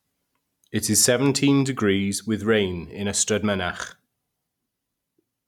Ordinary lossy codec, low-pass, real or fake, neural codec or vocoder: none; 19.8 kHz; real; none